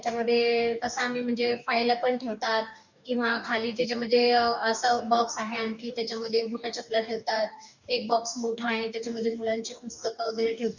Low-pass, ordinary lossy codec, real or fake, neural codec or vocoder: 7.2 kHz; none; fake; codec, 44.1 kHz, 2.6 kbps, DAC